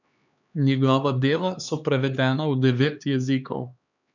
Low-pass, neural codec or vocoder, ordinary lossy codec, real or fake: 7.2 kHz; codec, 16 kHz, 2 kbps, X-Codec, HuBERT features, trained on LibriSpeech; none; fake